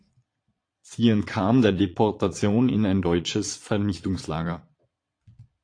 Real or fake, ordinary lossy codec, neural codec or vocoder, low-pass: fake; AAC, 48 kbps; vocoder, 22.05 kHz, 80 mel bands, Vocos; 9.9 kHz